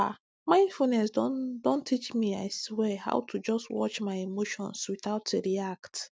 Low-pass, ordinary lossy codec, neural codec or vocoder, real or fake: none; none; none; real